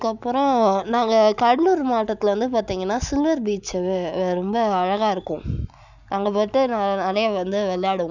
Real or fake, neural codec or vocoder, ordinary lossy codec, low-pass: real; none; none; 7.2 kHz